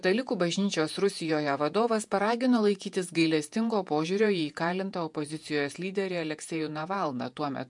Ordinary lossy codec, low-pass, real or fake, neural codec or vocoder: MP3, 64 kbps; 10.8 kHz; fake; vocoder, 48 kHz, 128 mel bands, Vocos